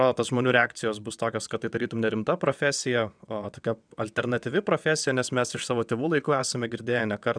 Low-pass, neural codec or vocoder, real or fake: 9.9 kHz; vocoder, 22.05 kHz, 80 mel bands, WaveNeXt; fake